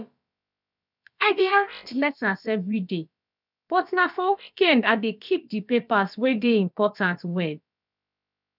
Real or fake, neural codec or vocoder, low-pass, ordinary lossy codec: fake; codec, 16 kHz, about 1 kbps, DyCAST, with the encoder's durations; 5.4 kHz; none